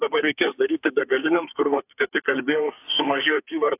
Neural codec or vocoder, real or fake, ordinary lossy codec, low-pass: codec, 32 kHz, 1.9 kbps, SNAC; fake; AAC, 24 kbps; 3.6 kHz